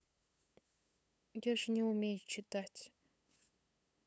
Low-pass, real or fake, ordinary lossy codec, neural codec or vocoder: none; fake; none; codec, 16 kHz, 8 kbps, FunCodec, trained on LibriTTS, 25 frames a second